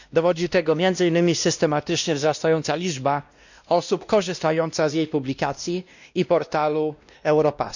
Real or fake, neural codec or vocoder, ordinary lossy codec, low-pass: fake; codec, 16 kHz, 1 kbps, X-Codec, WavLM features, trained on Multilingual LibriSpeech; none; 7.2 kHz